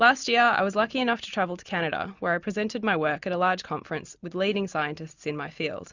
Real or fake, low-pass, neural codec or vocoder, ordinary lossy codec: real; 7.2 kHz; none; Opus, 64 kbps